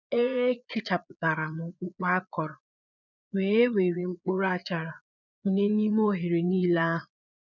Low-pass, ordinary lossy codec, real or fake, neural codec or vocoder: 7.2 kHz; none; fake; vocoder, 22.05 kHz, 80 mel bands, Vocos